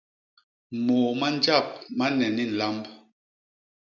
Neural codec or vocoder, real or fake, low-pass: none; real; 7.2 kHz